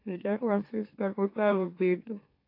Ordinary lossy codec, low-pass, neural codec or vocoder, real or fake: AAC, 32 kbps; 5.4 kHz; autoencoder, 44.1 kHz, a latent of 192 numbers a frame, MeloTTS; fake